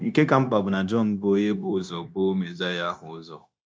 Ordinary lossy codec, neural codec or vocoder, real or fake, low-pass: none; codec, 16 kHz, 0.9 kbps, LongCat-Audio-Codec; fake; none